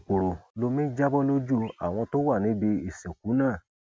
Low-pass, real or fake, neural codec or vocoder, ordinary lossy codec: none; real; none; none